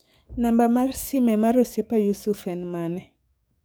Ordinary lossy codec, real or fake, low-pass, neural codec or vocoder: none; fake; none; codec, 44.1 kHz, 7.8 kbps, DAC